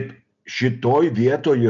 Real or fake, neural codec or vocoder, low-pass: real; none; 7.2 kHz